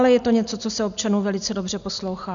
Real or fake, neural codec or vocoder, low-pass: real; none; 7.2 kHz